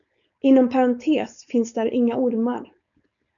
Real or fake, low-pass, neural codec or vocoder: fake; 7.2 kHz; codec, 16 kHz, 4.8 kbps, FACodec